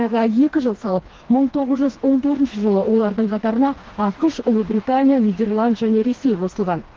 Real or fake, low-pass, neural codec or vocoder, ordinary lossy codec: fake; 7.2 kHz; codec, 16 kHz, 2 kbps, FreqCodec, smaller model; Opus, 16 kbps